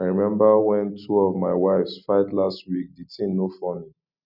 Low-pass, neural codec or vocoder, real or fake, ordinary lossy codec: 5.4 kHz; none; real; none